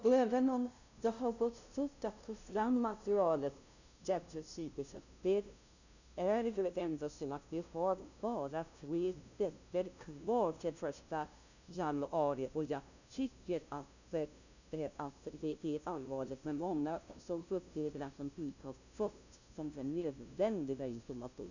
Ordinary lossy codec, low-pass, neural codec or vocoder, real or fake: none; 7.2 kHz; codec, 16 kHz, 0.5 kbps, FunCodec, trained on LibriTTS, 25 frames a second; fake